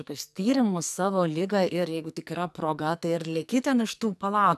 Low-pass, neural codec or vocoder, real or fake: 14.4 kHz; codec, 44.1 kHz, 2.6 kbps, SNAC; fake